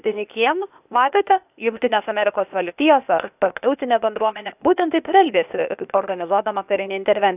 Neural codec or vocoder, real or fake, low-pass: codec, 16 kHz, 0.8 kbps, ZipCodec; fake; 3.6 kHz